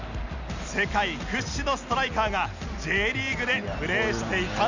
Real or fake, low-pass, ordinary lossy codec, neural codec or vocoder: real; 7.2 kHz; none; none